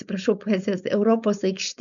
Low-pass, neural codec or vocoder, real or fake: 7.2 kHz; codec, 16 kHz, 4.8 kbps, FACodec; fake